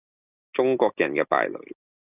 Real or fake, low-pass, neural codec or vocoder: real; 3.6 kHz; none